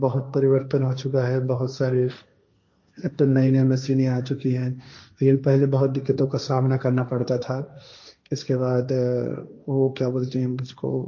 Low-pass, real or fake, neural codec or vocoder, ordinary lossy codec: 7.2 kHz; fake; codec, 16 kHz, 1.1 kbps, Voila-Tokenizer; MP3, 48 kbps